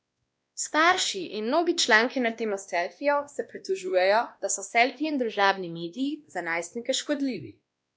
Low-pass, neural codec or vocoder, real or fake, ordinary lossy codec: none; codec, 16 kHz, 1 kbps, X-Codec, WavLM features, trained on Multilingual LibriSpeech; fake; none